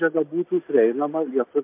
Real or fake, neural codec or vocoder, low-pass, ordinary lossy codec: real; none; 3.6 kHz; MP3, 32 kbps